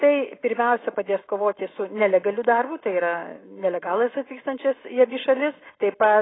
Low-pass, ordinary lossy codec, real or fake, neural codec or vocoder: 7.2 kHz; AAC, 16 kbps; real; none